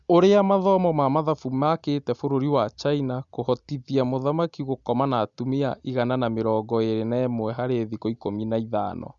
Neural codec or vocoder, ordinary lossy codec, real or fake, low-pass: none; Opus, 64 kbps; real; 7.2 kHz